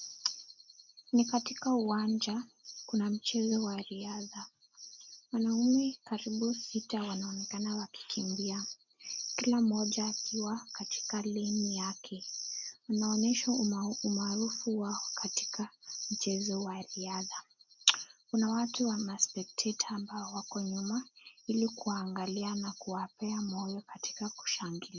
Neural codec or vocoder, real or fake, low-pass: none; real; 7.2 kHz